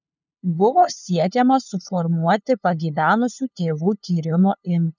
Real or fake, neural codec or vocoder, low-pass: fake; codec, 16 kHz, 2 kbps, FunCodec, trained on LibriTTS, 25 frames a second; 7.2 kHz